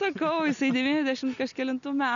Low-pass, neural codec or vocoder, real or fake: 7.2 kHz; none; real